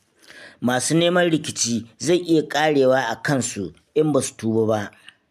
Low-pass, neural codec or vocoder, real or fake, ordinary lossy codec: 14.4 kHz; none; real; MP3, 96 kbps